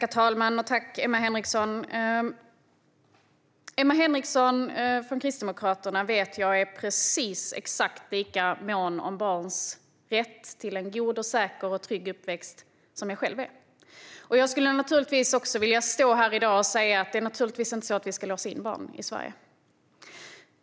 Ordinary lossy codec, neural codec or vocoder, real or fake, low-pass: none; none; real; none